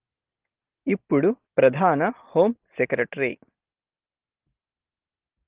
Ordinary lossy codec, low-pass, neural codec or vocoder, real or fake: Opus, 16 kbps; 3.6 kHz; none; real